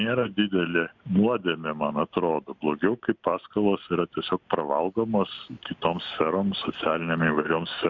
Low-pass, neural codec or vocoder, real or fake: 7.2 kHz; none; real